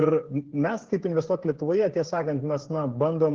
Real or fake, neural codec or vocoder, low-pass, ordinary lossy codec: fake; codec, 16 kHz, 8 kbps, FreqCodec, smaller model; 7.2 kHz; Opus, 16 kbps